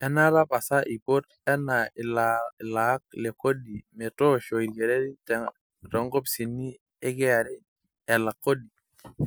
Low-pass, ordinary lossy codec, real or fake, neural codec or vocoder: none; none; real; none